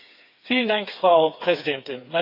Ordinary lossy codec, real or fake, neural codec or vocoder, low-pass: none; fake; codec, 16 kHz, 4 kbps, FreqCodec, smaller model; 5.4 kHz